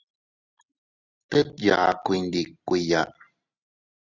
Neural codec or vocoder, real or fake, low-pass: none; real; 7.2 kHz